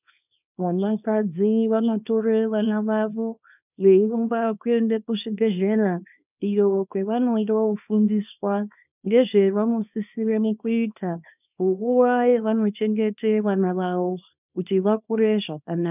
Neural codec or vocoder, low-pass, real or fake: codec, 24 kHz, 0.9 kbps, WavTokenizer, small release; 3.6 kHz; fake